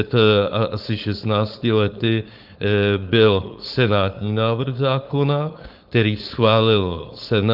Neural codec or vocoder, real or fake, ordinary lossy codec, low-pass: codec, 16 kHz, 4.8 kbps, FACodec; fake; Opus, 24 kbps; 5.4 kHz